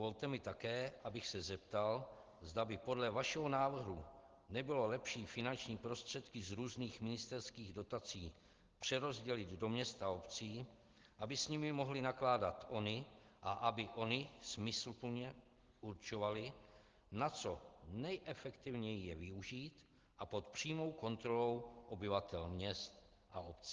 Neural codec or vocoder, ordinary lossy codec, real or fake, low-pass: none; Opus, 16 kbps; real; 7.2 kHz